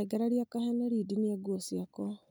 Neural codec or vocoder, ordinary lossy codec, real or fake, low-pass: none; none; real; none